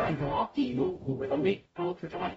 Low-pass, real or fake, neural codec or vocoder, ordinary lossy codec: 19.8 kHz; fake; codec, 44.1 kHz, 0.9 kbps, DAC; AAC, 24 kbps